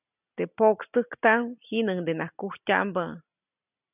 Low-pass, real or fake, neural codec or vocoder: 3.6 kHz; real; none